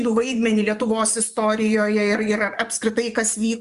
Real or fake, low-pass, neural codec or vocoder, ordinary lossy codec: fake; 10.8 kHz; vocoder, 24 kHz, 100 mel bands, Vocos; Opus, 64 kbps